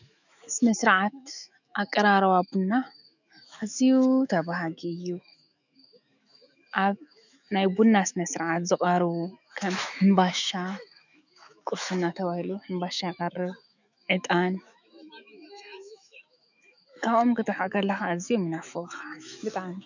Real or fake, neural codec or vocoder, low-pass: fake; autoencoder, 48 kHz, 128 numbers a frame, DAC-VAE, trained on Japanese speech; 7.2 kHz